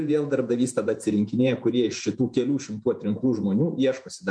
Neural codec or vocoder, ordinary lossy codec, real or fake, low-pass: vocoder, 48 kHz, 128 mel bands, Vocos; MP3, 64 kbps; fake; 9.9 kHz